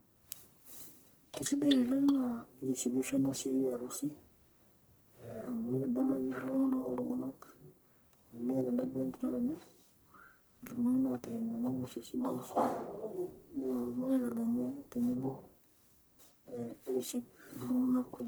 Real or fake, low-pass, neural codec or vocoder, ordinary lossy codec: fake; none; codec, 44.1 kHz, 1.7 kbps, Pupu-Codec; none